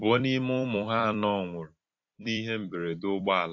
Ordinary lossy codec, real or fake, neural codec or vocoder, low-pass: AAC, 48 kbps; fake; vocoder, 44.1 kHz, 128 mel bands every 256 samples, BigVGAN v2; 7.2 kHz